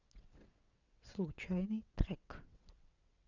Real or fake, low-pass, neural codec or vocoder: fake; 7.2 kHz; vocoder, 22.05 kHz, 80 mel bands, WaveNeXt